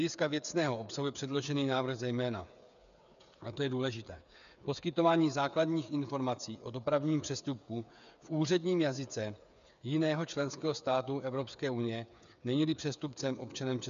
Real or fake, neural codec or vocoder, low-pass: fake; codec, 16 kHz, 8 kbps, FreqCodec, smaller model; 7.2 kHz